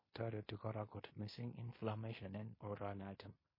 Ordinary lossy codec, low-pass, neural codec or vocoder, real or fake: MP3, 24 kbps; 5.4 kHz; codec, 16 kHz in and 24 kHz out, 0.9 kbps, LongCat-Audio-Codec, fine tuned four codebook decoder; fake